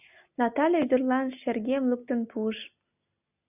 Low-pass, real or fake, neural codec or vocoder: 3.6 kHz; real; none